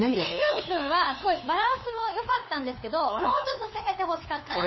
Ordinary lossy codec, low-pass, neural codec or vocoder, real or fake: MP3, 24 kbps; 7.2 kHz; codec, 16 kHz, 2 kbps, FunCodec, trained on LibriTTS, 25 frames a second; fake